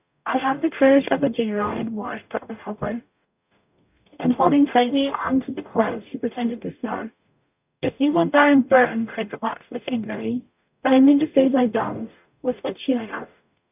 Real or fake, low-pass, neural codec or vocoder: fake; 3.6 kHz; codec, 44.1 kHz, 0.9 kbps, DAC